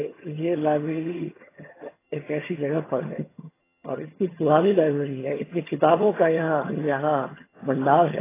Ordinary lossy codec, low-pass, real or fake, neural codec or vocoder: AAC, 16 kbps; 3.6 kHz; fake; vocoder, 22.05 kHz, 80 mel bands, HiFi-GAN